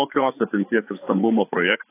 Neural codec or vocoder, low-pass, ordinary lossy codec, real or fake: codec, 16 kHz, 4 kbps, FreqCodec, larger model; 3.6 kHz; AAC, 24 kbps; fake